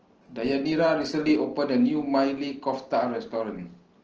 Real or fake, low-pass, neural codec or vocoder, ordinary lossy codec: real; 7.2 kHz; none; Opus, 16 kbps